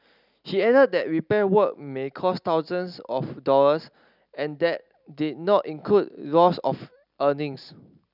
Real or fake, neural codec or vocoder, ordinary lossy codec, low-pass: real; none; none; 5.4 kHz